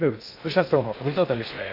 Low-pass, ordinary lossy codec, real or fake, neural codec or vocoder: 5.4 kHz; none; fake; codec, 16 kHz in and 24 kHz out, 0.6 kbps, FocalCodec, streaming, 2048 codes